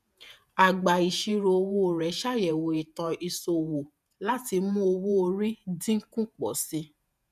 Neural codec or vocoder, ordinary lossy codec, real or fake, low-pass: vocoder, 48 kHz, 128 mel bands, Vocos; none; fake; 14.4 kHz